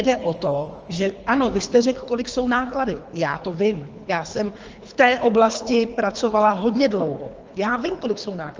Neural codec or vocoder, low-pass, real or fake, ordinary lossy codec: codec, 24 kHz, 3 kbps, HILCodec; 7.2 kHz; fake; Opus, 24 kbps